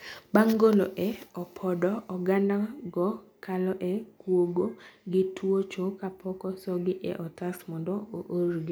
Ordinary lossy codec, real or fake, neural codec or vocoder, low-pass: none; real; none; none